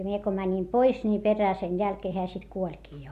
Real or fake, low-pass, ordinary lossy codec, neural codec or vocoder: real; 14.4 kHz; Opus, 64 kbps; none